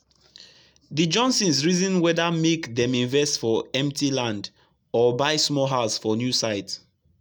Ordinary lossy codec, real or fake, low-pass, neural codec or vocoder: none; real; none; none